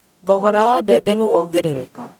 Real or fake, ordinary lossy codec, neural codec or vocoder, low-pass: fake; none; codec, 44.1 kHz, 0.9 kbps, DAC; 19.8 kHz